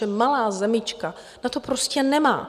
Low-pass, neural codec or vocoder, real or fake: 14.4 kHz; none; real